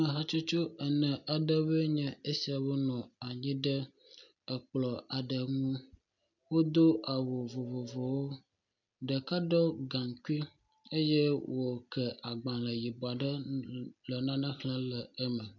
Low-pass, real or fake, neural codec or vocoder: 7.2 kHz; real; none